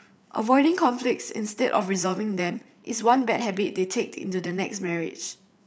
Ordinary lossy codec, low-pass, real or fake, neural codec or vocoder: none; none; fake; codec, 16 kHz, 8 kbps, FreqCodec, larger model